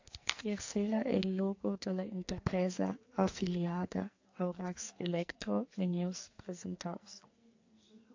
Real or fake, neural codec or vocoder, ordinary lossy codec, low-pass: fake; codec, 44.1 kHz, 2.6 kbps, SNAC; AAC, 48 kbps; 7.2 kHz